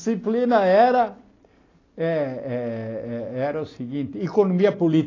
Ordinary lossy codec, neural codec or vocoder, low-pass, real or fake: AAC, 32 kbps; none; 7.2 kHz; real